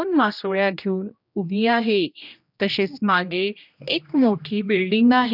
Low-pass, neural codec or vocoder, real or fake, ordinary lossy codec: 5.4 kHz; codec, 16 kHz, 1 kbps, X-Codec, HuBERT features, trained on general audio; fake; none